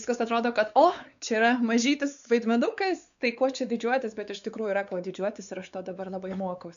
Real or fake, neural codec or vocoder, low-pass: fake; codec, 16 kHz, 4 kbps, X-Codec, WavLM features, trained on Multilingual LibriSpeech; 7.2 kHz